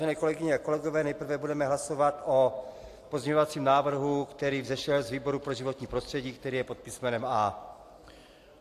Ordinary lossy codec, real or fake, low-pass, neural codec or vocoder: AAC, 64 kbps; real; 14.4 kHz; none